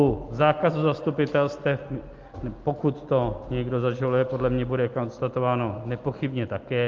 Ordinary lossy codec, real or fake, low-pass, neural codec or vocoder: Opus, 32 kbps; real; 7.2 kHz; none